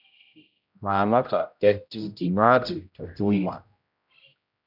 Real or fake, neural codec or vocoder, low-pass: fake; codec, 16 kHz, 0.5 kbps, X-Codec, HuBERT features, trained on general audio; 5.4 kHz